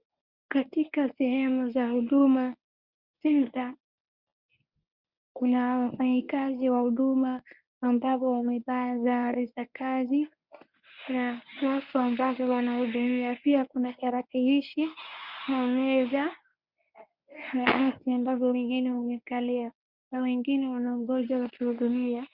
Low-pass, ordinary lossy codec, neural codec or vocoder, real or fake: 5.4 kHz; Opus, 64 kbps; codec, 24 kHz, 0.9 kbps, WavTokenizer, medium speech release version 1; fake